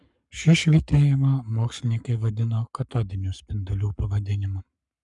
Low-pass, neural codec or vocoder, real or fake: 10.8 kHz; codec, 44.1 kHz, 7.8 kbps, Pupu-Codec; fake